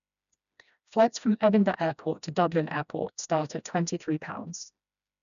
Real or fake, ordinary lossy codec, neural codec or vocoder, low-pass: fake; none; codec, 16 kHz, 1 kbps, FreqCodec, smaller model; 7.2 kHz